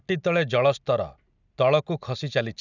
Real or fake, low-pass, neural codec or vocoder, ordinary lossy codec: real; 7.2 kHz; none; none